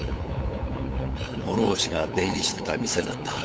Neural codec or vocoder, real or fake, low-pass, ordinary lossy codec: codec, 16 kHz, 8 kbps, FunCodec, trained on LibriTTS, 25 frames a second; fake; none; none